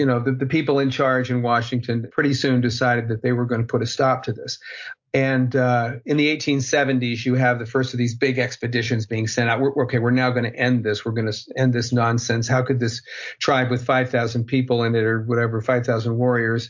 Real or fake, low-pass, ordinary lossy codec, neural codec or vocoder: real; 7.2 kHz; MP3, 48 kbps; none